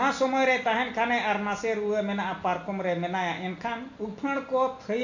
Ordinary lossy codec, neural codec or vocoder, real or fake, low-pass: AAC, 48 kbps; none; real; 7.2 kHz